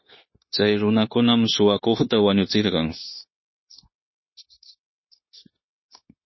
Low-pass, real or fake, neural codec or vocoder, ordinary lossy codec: 7.2 kHz; fake; codec, 16 kHz, 0.9 kbps, LongCat-Audio-Codec; MP3, 24 kbps